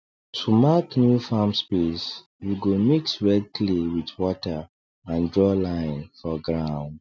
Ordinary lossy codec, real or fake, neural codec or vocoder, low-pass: none; real; none; none